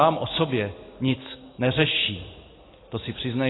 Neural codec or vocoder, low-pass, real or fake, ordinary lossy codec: none; 7.2 kHz; real; AAC, 16 kbps